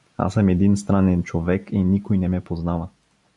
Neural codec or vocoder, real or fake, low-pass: none; real; 10.8 kHz